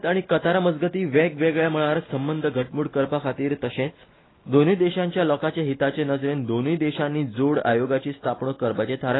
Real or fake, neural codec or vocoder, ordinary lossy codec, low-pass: real; none; AAC, 16 kbps; 7.2 kHz